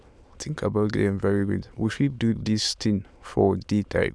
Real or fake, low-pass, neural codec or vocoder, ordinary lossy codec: fake; none; autoencoder, 22.05 kHz, a latent of 192 numbers a frame, VITS, trained on many speakers; none